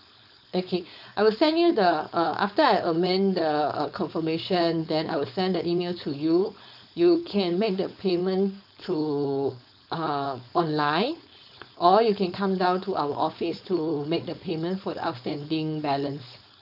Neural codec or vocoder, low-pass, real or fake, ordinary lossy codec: codec, 16 kHz, 4.8 kbps, FACodec; 5.4 kHz; fake; none